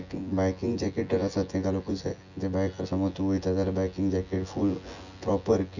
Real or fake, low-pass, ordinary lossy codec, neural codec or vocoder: fake; 7.2 kHz; none; vocoder, 24 kHz, 100 mel bands, Vocos